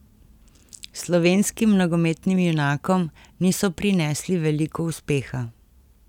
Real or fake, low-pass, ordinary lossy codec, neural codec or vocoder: real; 19.8 kHz; none; none